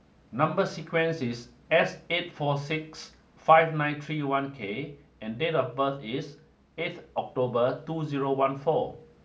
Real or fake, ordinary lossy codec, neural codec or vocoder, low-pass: real; none; none; none